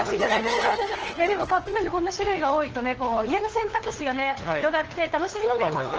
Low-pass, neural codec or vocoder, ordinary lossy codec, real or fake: 7.2 kHz; codec, 16 kHz, 2 kbps, FunCodec, trained on LibriTTS, 25 frames a second; Opus, 16 kbps; fake